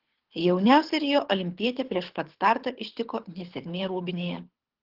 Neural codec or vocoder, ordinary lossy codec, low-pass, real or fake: codec, 24 kHz, 6 kbps, HILCodec; Opus, 16 kbps; 5.4 kHz; fake